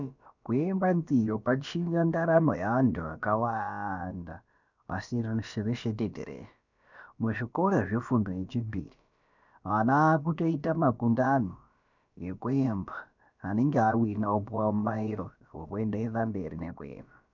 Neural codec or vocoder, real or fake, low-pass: codec, 16 kHz, about 1 kbps, DyCAST, with the encoder's durations; fake; 7.2 kHz